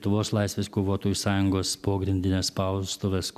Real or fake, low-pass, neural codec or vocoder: real; 14.4 kHz; none